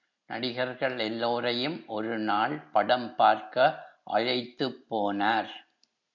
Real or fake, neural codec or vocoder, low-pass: real; none; 7.2 kHz